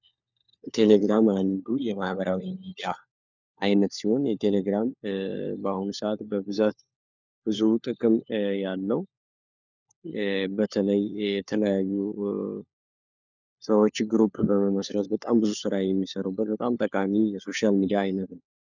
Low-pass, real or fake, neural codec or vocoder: 7.2 kHz; fake; codec, 16 kHz, 4 kbps, FunCodec, trained on LibriTTS, 50 frames a second